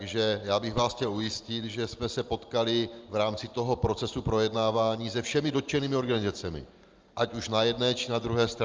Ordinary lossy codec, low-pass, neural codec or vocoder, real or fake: Opus, 24 kbps; 7.2 kHz; none; real